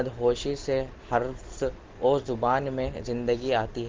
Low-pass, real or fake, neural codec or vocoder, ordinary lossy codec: 7.2 kHz; real; none; Opus, 16 kbps